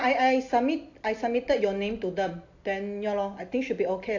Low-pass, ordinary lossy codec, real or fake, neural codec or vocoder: 7.2 kHz; AAC, 48 kbps; real; none